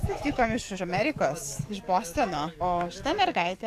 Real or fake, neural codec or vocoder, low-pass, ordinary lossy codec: fake; codec, 44.1 kHz, 7.8 kbps, DAC; 14.4 kHz; AAC, 64 kbps